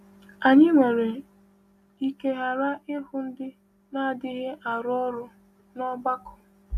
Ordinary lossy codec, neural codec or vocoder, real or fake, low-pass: AAC, 96 kbps; none; real; 14.4 kHz